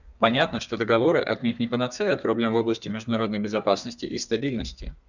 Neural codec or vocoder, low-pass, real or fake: codec, 32 kHz, 1.9 kbps, SNAC; 7.2 kHz; fake